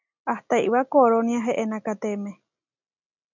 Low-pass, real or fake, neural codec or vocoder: 7.2 kHz; real; none